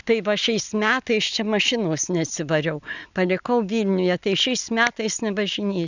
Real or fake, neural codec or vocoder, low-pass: real; none; 7.2 kHz